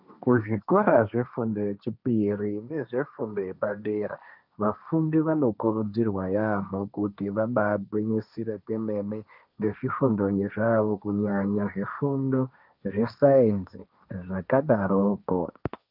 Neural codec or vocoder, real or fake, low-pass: codec, 16 kHz, 1.1 kbps, Voila-Tokenizer; fake; 5.4 kHz